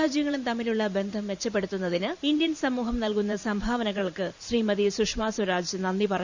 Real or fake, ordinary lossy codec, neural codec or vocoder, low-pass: fake; Opus, 64 kbps; vocoder, 44.1 kHz, 128 mel bands every 512 samples, BigVGAN v2; 7.2 kHz